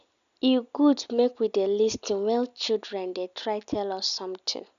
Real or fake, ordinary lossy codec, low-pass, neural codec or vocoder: real; none; 7.2 kHz; none